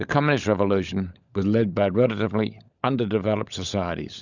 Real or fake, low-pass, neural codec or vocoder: fake; 7.2 kHz; codec, 16 kHz, 16 kbps, FunCodec, trained on LibriTTS, 50 frames a second